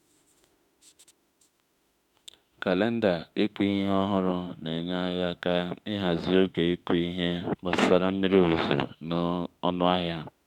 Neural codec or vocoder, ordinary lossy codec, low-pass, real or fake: autoencoder, 48 kHz, 32 numbers a frame, DAC-VAE, trained on Japanese speech; none; 19.8 kHz; fake